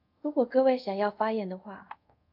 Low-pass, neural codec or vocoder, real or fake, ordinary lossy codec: 5.4 kHz; codec, 24 kHz, 0.5 kbps, DualCodec; fake; AAC, 48 kbps